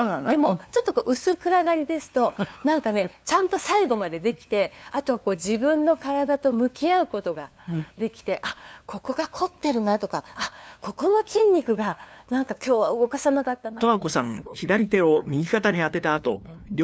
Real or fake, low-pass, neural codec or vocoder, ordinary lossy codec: fake; none; codec, 16 kHz, 2 kbps, FunCodec, trained on LibriTTS, 25 frames a second; none